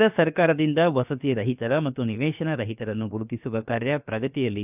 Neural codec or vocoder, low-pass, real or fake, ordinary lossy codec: codec, 16 kHz, about 1 kbps, DyCAST, with the encoder's durations; 3.6 kHz; fake; none